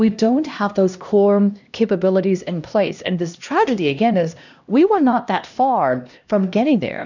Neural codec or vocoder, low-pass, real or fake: codec, 16 kHz, 1 kbps, X-Codec, HuBERT features, trained on LibriSpeech; 7.2 kHz; fake